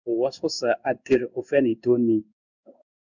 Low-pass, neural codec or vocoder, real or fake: 7.2 kHz; codec, 16 kHz in and 24 kHz out, 1 kbps, XY-Tokenizer; fake